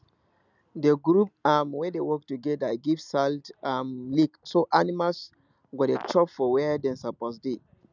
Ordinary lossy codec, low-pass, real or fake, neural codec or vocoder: none; 7.2 kHz; real; none